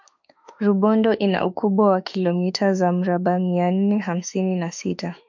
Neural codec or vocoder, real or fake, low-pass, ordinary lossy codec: autoencoder, 48 kHz, 32 numbers a frame, DAC-VAE, trained on Japanese speech; fake; 7.2 kHz; MP3, 64 kbps